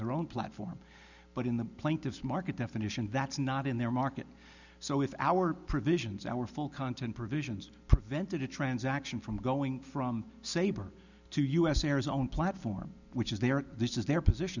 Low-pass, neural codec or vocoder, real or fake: 7.2 kHz; none; real